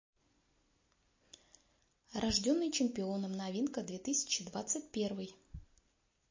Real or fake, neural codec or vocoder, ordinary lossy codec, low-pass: real; none; MP3, 32 kbps; 7.2 kHz